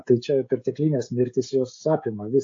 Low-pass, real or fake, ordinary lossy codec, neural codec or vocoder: 7.2 kHz; fake; AAC, 48 kbps; codec, 16 kHz, 16 kbps, FreqCodec, smaller model